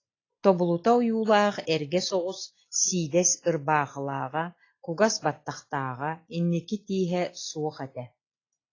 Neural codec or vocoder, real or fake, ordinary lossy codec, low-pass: none; real; AAC, 32 kbps; 7.2 kHz